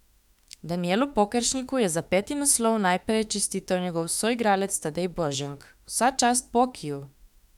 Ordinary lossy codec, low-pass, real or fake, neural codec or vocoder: none; 19.8 kHz; fake; autoencoder, 48 kHz, 32 numbers a frame, DAC-VAE, trained on Japanese speech